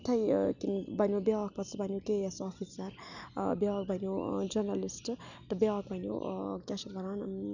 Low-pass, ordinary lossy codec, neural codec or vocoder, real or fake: 7.2 kHz; none; none; real